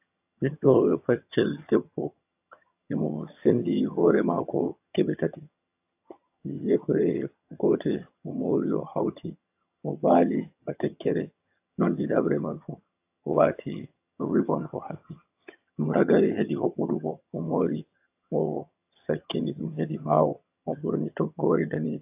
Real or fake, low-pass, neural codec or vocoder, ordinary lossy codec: fake; 3.6 kHz; vocoder, 22.05 kHz, 80 mel bands, HiFi-GAN; AAC, 32 kbps